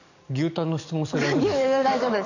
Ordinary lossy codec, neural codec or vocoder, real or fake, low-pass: none; codec, 44.1 kHz, 7.8 kbps, DAC; fake; 7.2 kHz